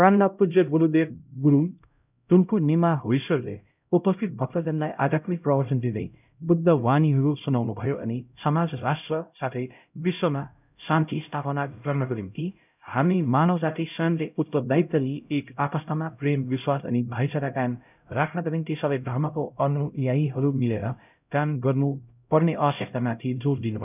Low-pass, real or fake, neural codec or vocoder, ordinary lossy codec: 3.6 kHz; fake; codec, 16 kHz, 0.5 kbps, X-Codec, HuBERT features, trained on LibriSpeech; none